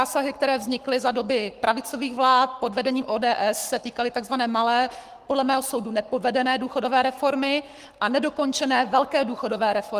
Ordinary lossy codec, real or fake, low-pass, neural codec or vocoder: Opus, 24 kbps; fake; 14.4 kHz; codec, 44.1 kHz, 7.8 kbps, Pupu-Codec